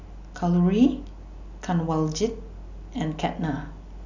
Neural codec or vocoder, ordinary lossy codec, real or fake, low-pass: none; none; real; 7.2 kHz